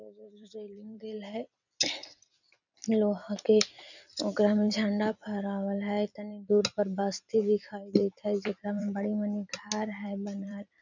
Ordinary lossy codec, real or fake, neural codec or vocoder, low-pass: none; fake; vocoder, 44.1 kHz, 128 mel bands every 256 samples, BigVGAN v2; 7.2 kHz